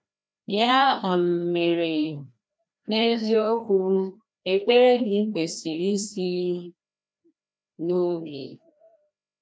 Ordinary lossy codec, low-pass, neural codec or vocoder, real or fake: none; none; codec, 16 kHz, 1 kbps, FreqCodec, larger model; fake